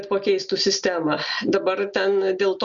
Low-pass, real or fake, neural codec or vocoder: 7.2 kHz; real; none